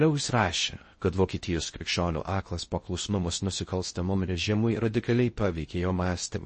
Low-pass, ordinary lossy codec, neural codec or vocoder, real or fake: 10.8 kHz; MP3, 32 kbps; codec, 16 kHz in and 24 kHz out, 0.6 kbps, FocalCodec, streaming, 4096 codes; fake